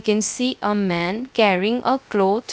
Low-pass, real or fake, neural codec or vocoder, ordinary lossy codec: none; fake; codec, 16 kHz, 0.3 kbps, FocalCodec; none